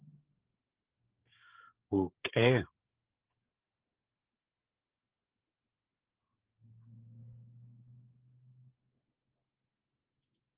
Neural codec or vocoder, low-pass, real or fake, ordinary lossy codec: codec, 16 kHz, 16 kbps, FreqCodec, smaller model; 3.6 kHz; fake; Opus, 16 kbps